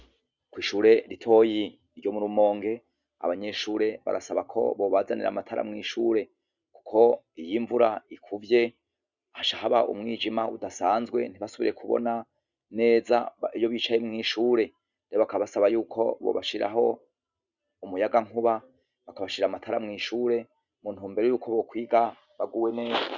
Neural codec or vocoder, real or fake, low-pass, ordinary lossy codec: none; real; 7.2 kHz; Opus, 64 kbps